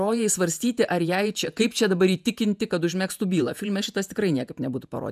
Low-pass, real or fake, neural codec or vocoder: 14.4 kHz; fake; vocoder, 48 kHz, 128 mel bands, Vocos